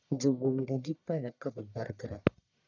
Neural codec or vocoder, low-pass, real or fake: codec, 44.1 kHz, 1.7 kbps, Pupu-Codec; 7.2 kHz; fake